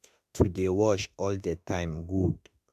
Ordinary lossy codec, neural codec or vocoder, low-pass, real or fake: MP3, 96 kbps; autoencoder, 48 kHz, 32 numbers a frame, DAC-VAE, trained on Japanese speech; 14.4 kHz; fake